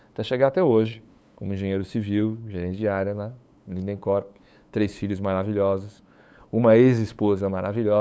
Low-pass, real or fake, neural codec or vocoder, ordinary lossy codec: none; fake; codec, 16 kHz, 8 kbps, FunCodec, trained on LibriTTS, 25 frames a second; none